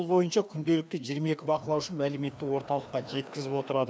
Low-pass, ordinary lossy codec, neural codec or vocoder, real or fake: none; none; codec, 16 kHz, 2 kbps, FreqCodec, larger model; fake